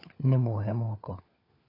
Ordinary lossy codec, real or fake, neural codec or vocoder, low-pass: AAC, 24 kbps; fake; codec, 16 kHz, 4 kbps, FunCodec, trained on LibriTTS, 50 frames a second; 5.4 kHz